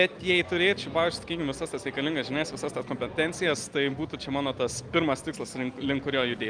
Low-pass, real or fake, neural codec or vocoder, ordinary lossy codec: 9.9 kHz; real; none; Opus, 32 kbps